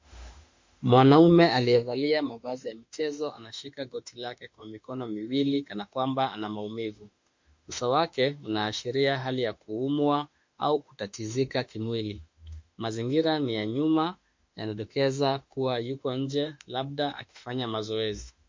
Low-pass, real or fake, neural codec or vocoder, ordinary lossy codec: 7.2 kHz; fake; autoencoder, 48 kHz, 32 numbers a frame, DAC-VAE, trained on Japanese speech; MP3, 48 kbps